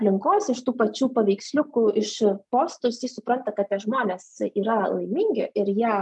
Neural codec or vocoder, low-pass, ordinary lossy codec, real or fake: none; 10.8 kHz; MP3, 96 kbps; real